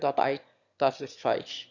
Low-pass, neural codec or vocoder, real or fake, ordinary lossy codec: 7.2 kHz; autoencoder, 22.05 kHz, a latent of 192 numbers a frame, VITS, trained on one speaker; fake; Opus, 64 kbps